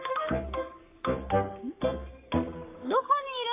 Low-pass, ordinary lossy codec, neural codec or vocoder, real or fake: 3.6 kHz; AAC, 24 kbps; codec, 44.1 kHz, 3.4 kbps, Pupu-Codec; fake